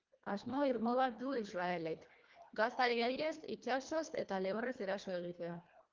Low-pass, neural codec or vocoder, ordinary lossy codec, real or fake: 7.2 kHz; codec, 24 kHz, 1.5 kbps, HILCodec; Opus, 32 kbps; fake